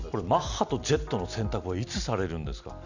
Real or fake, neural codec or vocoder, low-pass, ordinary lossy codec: real; none; 7.2 kHz; none